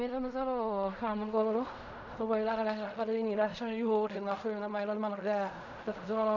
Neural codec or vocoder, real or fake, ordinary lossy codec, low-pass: codec, 16 kHz in and 24 kHz out, 0.4 kbps, LongCat-Audio-Codec, fine tuned four codebook decoder; fake; none; 7.2 kHz